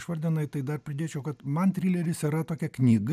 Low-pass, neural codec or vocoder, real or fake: 14.4 kHz; none; real